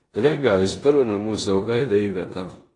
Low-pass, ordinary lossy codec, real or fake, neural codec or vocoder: 10.8 kHz; AAC, 32 kbps; fake; codec, 16 kHz in and 24 kHz out, 0.9 kbps, LongCat-Audio-Codec, four codebook decoder